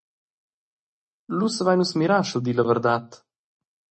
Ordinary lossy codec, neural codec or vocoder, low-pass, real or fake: MP3, 32 kbps; none; 10.8 kHz; real